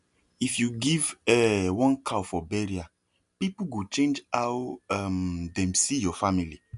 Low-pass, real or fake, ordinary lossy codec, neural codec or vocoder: 10.8 kHz; real; AAC, 96 kbps; none